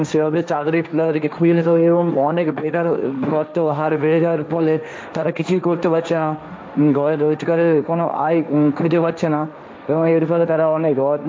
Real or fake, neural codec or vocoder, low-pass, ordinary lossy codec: fake; codec, 16 kHz, 1.1 kbps, Voila-Tokenizer; none; none